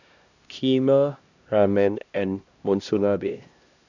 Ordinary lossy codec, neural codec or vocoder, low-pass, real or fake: none; codec, 16 kHz, 1 kbps, X-Codec, HuBERT features, trained on LibriSpeech; 7.2 kHz; fake